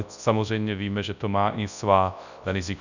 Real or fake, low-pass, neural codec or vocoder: fake; 7.2 kHz; codec, 24 kHz, 0.9 kbps, WavTokenizer, large speech release